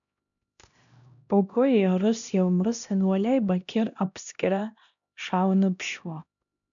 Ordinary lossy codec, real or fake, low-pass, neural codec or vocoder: MP3, 96 kbps; fake; 7.2 kHz; codec, 16 kHz, 1 kbps, X-Codec, HuBERT features, trained on LibriSpeech